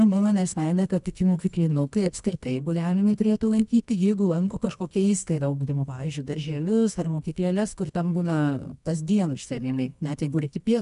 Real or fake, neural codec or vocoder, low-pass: fake; codec, 24 kHz, 0.9 kbps, WavTokenizer, medium music audio release; 10.8 kHz